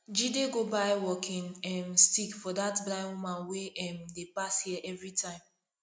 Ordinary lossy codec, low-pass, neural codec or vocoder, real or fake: none; none; none; real